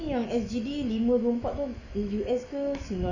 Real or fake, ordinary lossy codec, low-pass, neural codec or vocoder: fake; none; 7.2 kHz; autoencoder, 48 kHz, 128 numbers a frame, DAC-VAE, trained on Japanese speech